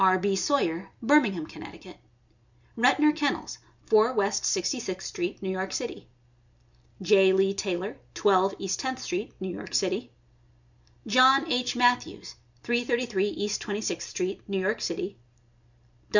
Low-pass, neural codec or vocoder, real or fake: 7.2 kHz; none; real